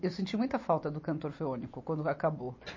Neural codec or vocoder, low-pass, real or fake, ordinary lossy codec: none; 7.2 kHz; real; MP3, 32 kbps